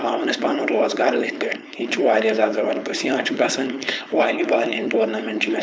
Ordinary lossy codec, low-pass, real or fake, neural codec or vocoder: none; none; fake; codec, 16 kHz, 4.8 kbps, FACodec